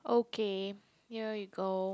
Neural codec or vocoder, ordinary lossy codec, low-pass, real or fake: none; none; none; real